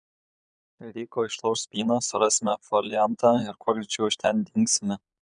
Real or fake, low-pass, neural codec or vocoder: fake; 9.9 kHz; vocoder, 22.05 kHz, 80 mel bands, Vocos